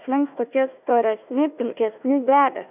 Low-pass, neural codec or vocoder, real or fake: 3.6 kHz; codec, 16 kHz, 1 kbps, FunCodec, trained on Chinese and English, 50 frames a second; fake